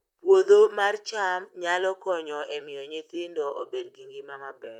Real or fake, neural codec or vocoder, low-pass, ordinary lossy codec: fake; vocoder, 44.1 kHz, 128 mel bands, Pupu-Vocoder; 19.8 kHz; none